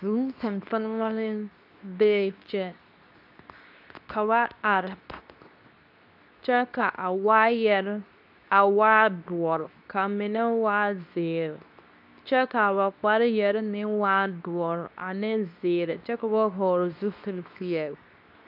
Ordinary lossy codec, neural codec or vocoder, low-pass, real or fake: AAC, 48 kbps; codec, 24 kHz, 0.9 kbps, WavTokenizer, medium speech release version 2; 5.4 kHz; fake